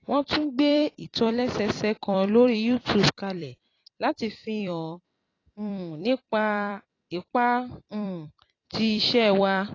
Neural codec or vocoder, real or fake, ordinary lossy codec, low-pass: vocoder, 44.1 kHz, 128 mel bands every 256 samples, BigVGAN v2; fake; AAC, 32 kbps; 7.2 kHz